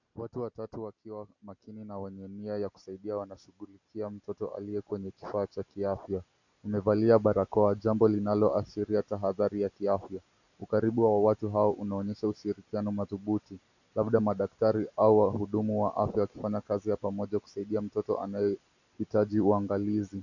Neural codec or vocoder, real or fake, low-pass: none; real; 7.2 kHz